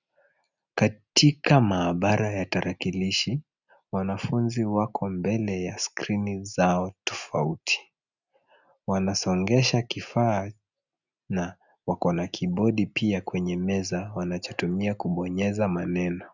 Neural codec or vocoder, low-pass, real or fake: none; 7.2 kHz; real